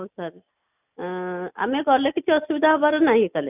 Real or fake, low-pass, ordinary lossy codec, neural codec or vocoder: real; 3.6 kHz; none; none